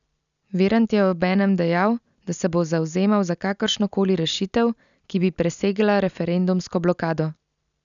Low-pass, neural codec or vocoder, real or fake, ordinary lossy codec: 7.2 kHz; none; real; none